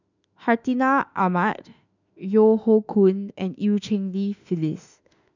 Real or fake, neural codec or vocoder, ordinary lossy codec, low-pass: fake; autoencoder, 48 kHz, 32 numbers a frame, DAC-VAE, trained on Japanese speech; none; 7.2 kHz